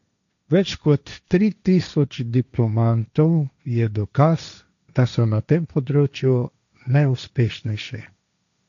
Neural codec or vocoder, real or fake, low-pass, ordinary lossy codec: codec, 16 kHz, 1.1 kbps, Voila-Tokenizer; fake; 7.2 kHz; none